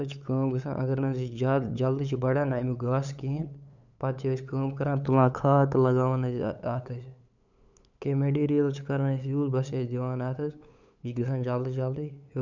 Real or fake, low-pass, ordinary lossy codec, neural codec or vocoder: fake; 7.2 kHz; none; codec, 16 kHz, 8 kbps, FunCodec, trained on LibriTTS, 25 frames a second